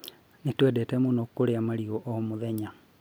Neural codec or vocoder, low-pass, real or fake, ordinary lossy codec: none; none; real; none